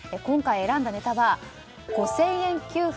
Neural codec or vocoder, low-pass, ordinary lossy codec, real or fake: none; none; none; real